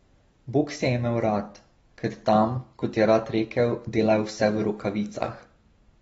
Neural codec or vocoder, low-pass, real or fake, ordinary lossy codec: none; 19.8 kHz; real; AAC, 24 kbps